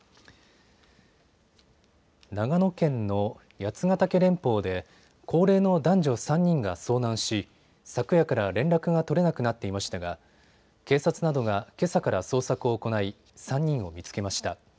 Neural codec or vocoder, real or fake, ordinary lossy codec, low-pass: none; real; none; none